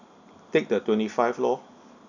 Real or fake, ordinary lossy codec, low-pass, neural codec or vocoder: real; none; 7.2 kHz; none